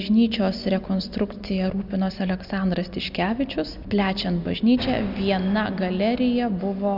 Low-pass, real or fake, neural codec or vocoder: 5.4 kHz; real; none